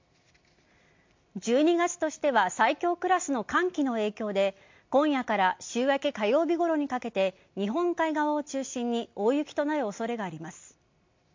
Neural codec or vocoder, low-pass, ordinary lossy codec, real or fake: none; 7.2 kHz; MP3, 48 kbps; real